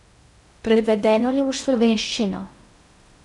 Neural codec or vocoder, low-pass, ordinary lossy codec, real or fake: codec, 16 kHz in and 24 kHz out, 0.6 kbps, FocalCodec, streaming, 2048 codes; 10.8 kHz; none; fake